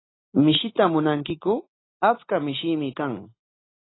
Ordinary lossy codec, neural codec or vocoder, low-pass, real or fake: AAC, 16 kbps; none; 7.2 kHz; real